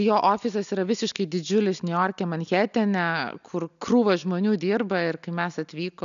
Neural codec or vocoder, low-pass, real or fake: none; 7.2 kHz; real